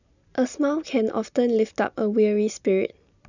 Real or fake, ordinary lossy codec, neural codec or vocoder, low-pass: real; none; none; 7.2 kHz